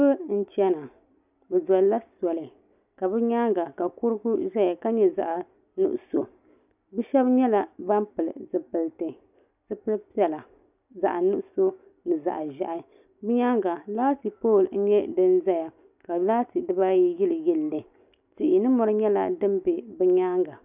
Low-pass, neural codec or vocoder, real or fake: 3.6 kHz; none; real